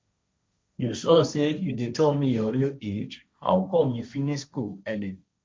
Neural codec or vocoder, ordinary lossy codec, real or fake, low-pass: codec, 16 kHz, 1.1 kbps, Voila-Tokenizer; none; fake; none